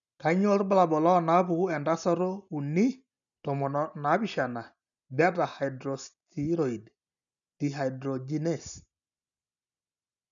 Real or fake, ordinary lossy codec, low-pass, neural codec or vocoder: real; none; 7.2 kHz; none